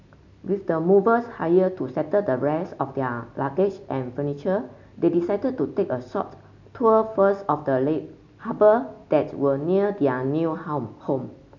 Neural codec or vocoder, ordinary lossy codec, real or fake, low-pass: none; none; real; 7.2 kHz